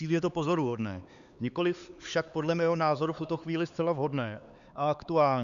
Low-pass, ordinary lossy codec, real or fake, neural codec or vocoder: 7.2 kHz; Opus, 64 kbps; fake; codec, 16 kHz, 4 kbps, X-Codec, HuBERT features, trained on LibriSpeech